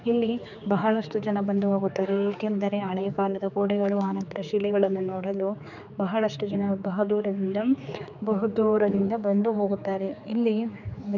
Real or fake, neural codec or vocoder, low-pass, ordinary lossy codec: fake; codec, 16 kHz, 4 kbps, X-Codec, HuBERT features, trained on general audio; 7.2 kHz; none